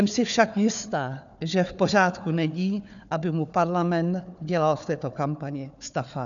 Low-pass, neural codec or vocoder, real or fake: 7.2 kHz; codec, 16 kHz, 4 kbps, FunCodec, trained on Chinese and English, 50 frames a second; fake